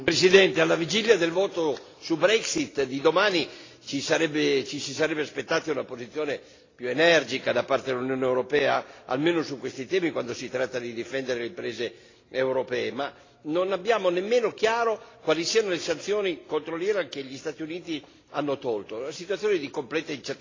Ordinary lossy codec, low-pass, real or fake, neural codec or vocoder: AAC, 32 kbps; 7.2 kHz; real; none